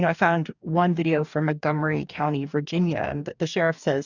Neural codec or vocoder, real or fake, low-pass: codec, 44.1 kHz, 2.6 kbps, DAC; fake; 7.2 kHz